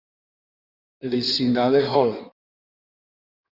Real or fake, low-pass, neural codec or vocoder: fake; 5.4 kHz; codec, 16 kHz in and 24 kHz out, 1.1 kbps, FireRedTTS-2 codec